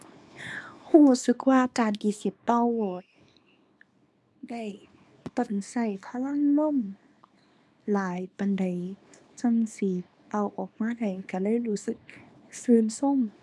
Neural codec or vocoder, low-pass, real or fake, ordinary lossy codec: codec, 24 kHz, 0.9 kbps, WavTokenizer, small release; none; fake; none